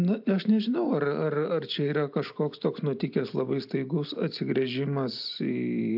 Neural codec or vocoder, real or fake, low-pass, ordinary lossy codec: none; real; 5.4 kHz; AAC, 48 kbps